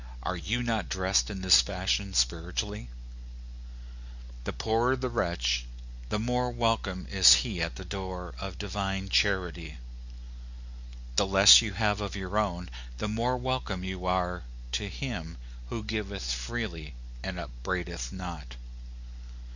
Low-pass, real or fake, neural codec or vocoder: 7.2 kHz; real; none